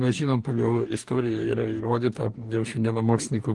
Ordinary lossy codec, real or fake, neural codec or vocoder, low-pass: Opus, 24 kbps; fake; codec, 44.1 kHz, 2.6 kbps, DAC; 10.8 kHz